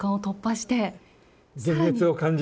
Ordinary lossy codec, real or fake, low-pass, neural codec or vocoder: none; real; none; none